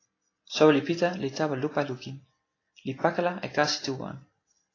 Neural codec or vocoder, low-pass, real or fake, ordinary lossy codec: none; 7.2 kHz; real; AAC, 32 kbps